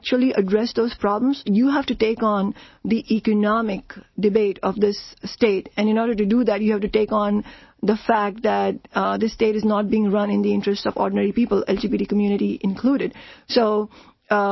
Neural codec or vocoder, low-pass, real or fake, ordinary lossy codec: none; 7.2 kHz; real; MP3, 24 kbps